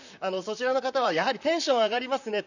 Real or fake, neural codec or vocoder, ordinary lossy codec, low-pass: fake; vocoder, 44.1 kHz, 128 mel bands, Pupu-Vocoder; none; 7.2 kHz